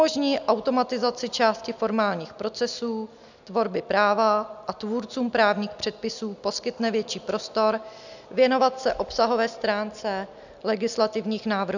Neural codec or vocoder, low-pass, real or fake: none; 7.2 kHz; real